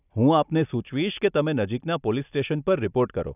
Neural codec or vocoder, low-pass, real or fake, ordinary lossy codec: none; 3.6 kHz; real; none